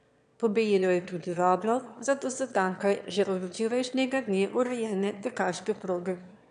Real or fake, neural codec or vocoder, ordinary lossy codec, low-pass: fake; autoencoder, 22.05 kHz, a latent of 192 numbers a frame, VITS, trained on one speaker; AAC, 96 kbps; 9.9 kHz